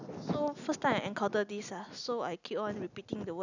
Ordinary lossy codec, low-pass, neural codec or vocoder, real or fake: none; 7.2 kHz; none; real